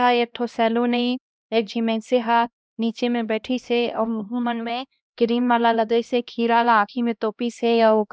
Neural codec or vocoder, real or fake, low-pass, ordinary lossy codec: codec, 16 kHz, 1 kbps, X-Codec, HuBERT features, trained on LibriSpeech; fake; none; none